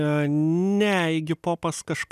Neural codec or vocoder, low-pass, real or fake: none; 14.4 kHz; real